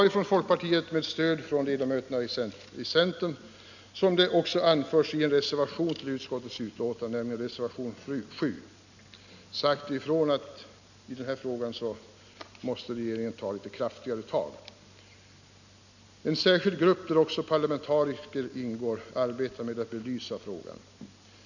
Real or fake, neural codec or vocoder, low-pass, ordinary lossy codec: real; none; 7.2 kHz; none